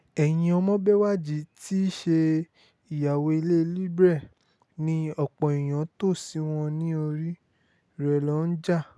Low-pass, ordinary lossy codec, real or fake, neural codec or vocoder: none; none; real; none